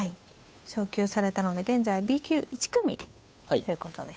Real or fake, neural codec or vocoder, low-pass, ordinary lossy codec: fake; codec, 16 kHz, 2 kbps, FunCodec, trained on Chinese and English, 25 frames a second; none; none